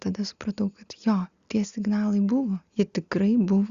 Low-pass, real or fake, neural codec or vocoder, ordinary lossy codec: 7.2 kHz; real; none; Opus, 64 kbps